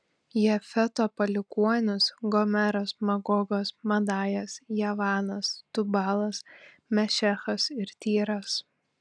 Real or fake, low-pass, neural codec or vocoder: real; 9.9 kHz; none